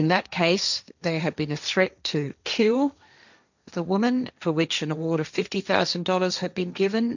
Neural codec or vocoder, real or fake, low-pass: codec, 16 kHz, 1.1 kbps, Voila-Tokenizer; fake; 7.2 kHz